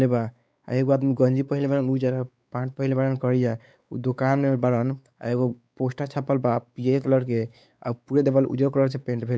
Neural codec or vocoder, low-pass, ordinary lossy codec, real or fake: codec, 16 kHz, 4 kbps, X-Codec, WavLM features, trained on Multilingual LibriSpeech; none; none; fake